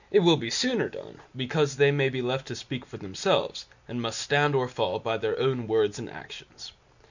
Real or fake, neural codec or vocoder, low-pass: real; none; 7.2 kHz